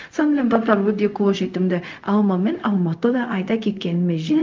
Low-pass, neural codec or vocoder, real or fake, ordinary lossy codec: 7.2 kHz; codec, 16 kHz, 0.4 kbps, LongCat-Audio-Codec; fake; Opus, 24 kbps